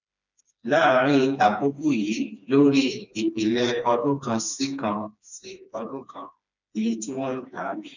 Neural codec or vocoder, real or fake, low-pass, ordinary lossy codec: codec, 16 kHz, 2 kbps, FreqCodec, smaller model; fake; 7.2 kHz; none